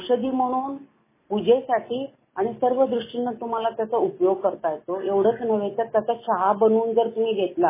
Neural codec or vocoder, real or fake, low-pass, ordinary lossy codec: none; real; 3.6 kHz; MP3, 16 kbps